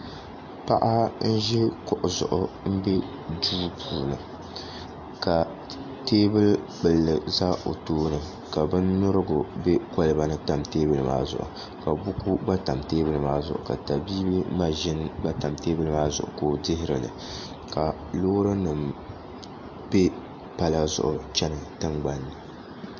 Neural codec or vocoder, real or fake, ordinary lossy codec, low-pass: none; real; MP3, 48 kbps; 7.2 kHz